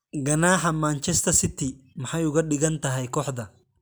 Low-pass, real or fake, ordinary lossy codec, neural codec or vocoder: none; real; none; none